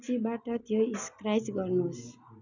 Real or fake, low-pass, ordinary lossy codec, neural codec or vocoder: real; 7.2 kHz; none; none